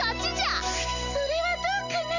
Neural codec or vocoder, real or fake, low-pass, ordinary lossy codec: none; real; 7.2 kHz; none